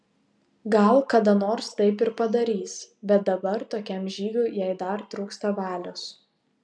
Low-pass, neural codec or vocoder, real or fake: 9.9 kHz; none; real